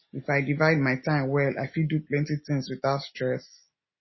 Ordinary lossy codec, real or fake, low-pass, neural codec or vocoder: MP3, 24 kbps; real; 7.2 kHz; none